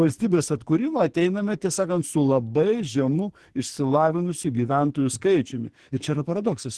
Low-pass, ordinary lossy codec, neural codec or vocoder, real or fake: 10.8 kHz; Opus, 16 kbps; codec, 44.1 kHz, 2.6 kbps, SNAC; fake